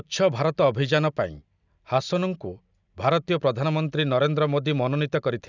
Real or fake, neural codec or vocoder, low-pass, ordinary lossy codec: real; none; 7.2 kHz; none